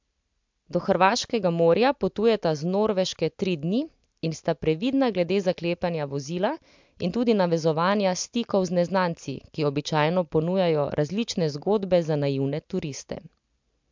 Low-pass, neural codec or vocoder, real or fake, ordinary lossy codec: 7.2 kHz; none; real; MP3, 64 kbps